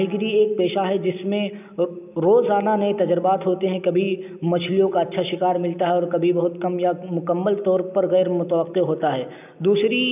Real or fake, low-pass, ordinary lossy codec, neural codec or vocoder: real; 3.6 kHz; none; none